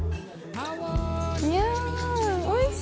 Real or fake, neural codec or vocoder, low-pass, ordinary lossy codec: real; none; none; none